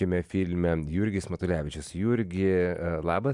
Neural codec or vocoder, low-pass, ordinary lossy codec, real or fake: none; 10.8 kHz; MP3, 96 kbps; real